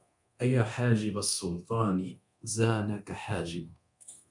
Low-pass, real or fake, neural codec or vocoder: 10.8 kHz; fake; codec, 24 kHz, 0.9 kbps, DualCodec